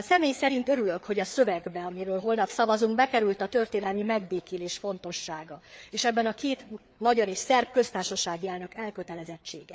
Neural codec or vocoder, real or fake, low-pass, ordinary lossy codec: codec, 16 kHz, 4 kbps, FreqCodec, larger model; fake; none; none